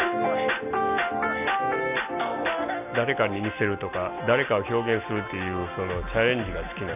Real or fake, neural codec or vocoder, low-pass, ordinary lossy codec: real; none; 3.6 kHz; none